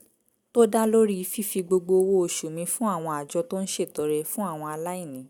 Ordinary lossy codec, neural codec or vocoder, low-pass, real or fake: none; none; none; real